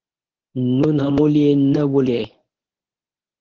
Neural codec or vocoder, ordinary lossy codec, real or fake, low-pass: codec, 24 kHz, 0.9 kbps, WavTokenizer, medium speech release version 1; Opus, 16 kbps; fake; 7.2 kHz